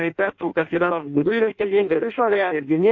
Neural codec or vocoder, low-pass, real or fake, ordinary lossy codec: codec, 16 kHz in and 24 kHz out, 0.6 kbps, FireRedTTS-2 codec; 7.2 kHz; fake; AAC, 48 kbps